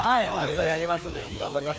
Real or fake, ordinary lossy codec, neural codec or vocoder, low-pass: fake; none; codec, 16 kHz, 2 kbps, FreqCodec, larger model; none